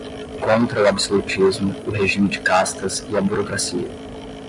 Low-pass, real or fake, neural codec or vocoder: 10.8 kHz; real; none